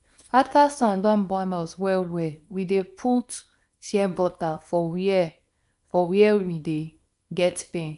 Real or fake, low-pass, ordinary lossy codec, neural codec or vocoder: fake; 10.8 kHz; none; codec, 24 kHz, 0.9 kbps, WavTokenizer, small release